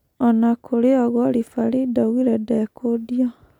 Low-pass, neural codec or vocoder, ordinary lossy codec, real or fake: 19.8 kHz; none; none; real